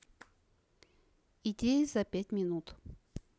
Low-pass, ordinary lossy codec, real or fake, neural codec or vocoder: none; none; real; none